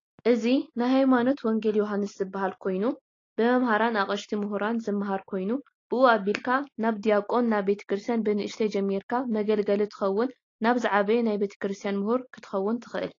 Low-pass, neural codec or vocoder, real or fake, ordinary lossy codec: 7.2 kHz; none; real; AAC, 32 kbps